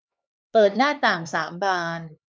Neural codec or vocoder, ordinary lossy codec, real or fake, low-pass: codec, 16 kHz, 4 kbps, X-Codec, HuBERT features, trained on LibriSpeech; none; fake; none